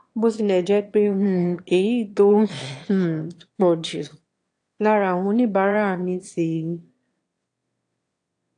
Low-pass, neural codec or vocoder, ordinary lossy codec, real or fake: 9.9 kHz; autoencoder, 22.05 kHz, a latent of 192 numbers a frame, VITS, trained on one speaker; AAC, 48 kbps; fake